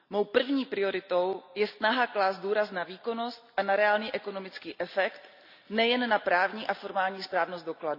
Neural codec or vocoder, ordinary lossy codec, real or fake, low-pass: none; none; real; 5.4 kHz